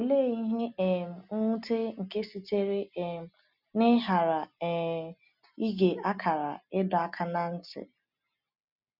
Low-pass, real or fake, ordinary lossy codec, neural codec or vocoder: 5.4 kHz; real; none; none